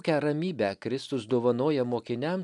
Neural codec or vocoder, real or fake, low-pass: none; real; 10.8 kHz